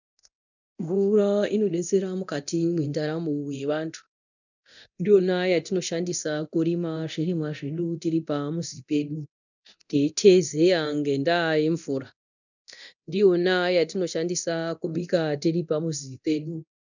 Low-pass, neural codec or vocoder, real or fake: 7.2 kHz; codec, 24 kHz, 0.9 kbps, DualCodec; fake